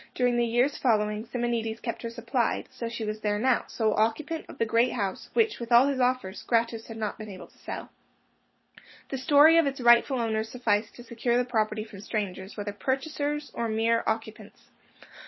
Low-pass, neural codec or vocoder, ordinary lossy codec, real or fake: 7.2 kHz; none; MP3, 24 kbps; real